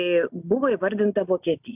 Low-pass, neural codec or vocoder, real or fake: 3.6 kHz; none; real